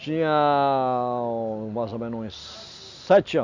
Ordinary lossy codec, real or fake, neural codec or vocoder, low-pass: Opus, 64 kbps; real; none; 7.2 kHz